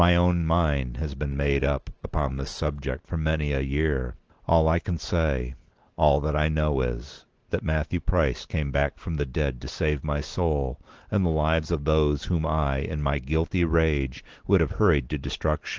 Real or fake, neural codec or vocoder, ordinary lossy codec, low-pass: real; none; Opus, 16 kbps; 7.2 kHz